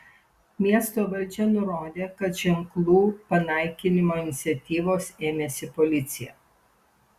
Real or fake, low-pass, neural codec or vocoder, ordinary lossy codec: real; 14.4 kHz; none; Opus, 64 kbps